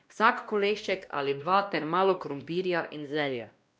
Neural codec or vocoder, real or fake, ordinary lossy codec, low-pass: codec, 16 kHz, 1 kbps, X-Codec, WavLM features, trained on Multilingual LibriSpeech; fake; none; none